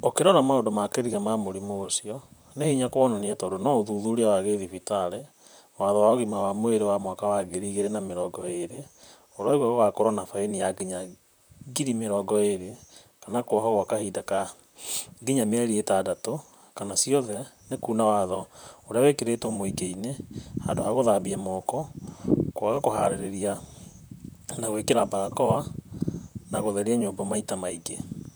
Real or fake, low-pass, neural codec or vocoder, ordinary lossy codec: fake; none; vocoder, 44.1 kHz, 128 mel bands, Pupu-Vocoder; none